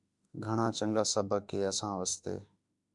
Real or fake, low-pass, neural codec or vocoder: fake; 10.8 kHz; autoencoder, 48 kHz, 32 numbers a frame, DAC-VAE, trained on Japanese speech